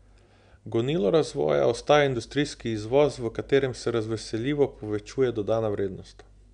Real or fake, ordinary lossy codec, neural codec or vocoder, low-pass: real; none; none; 9.9 kHz